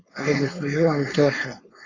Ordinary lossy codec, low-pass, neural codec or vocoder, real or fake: AAC, 32 kbps; 7.2 kHz; codec, 16 kHz, 4.8 kbps, FACodec; fake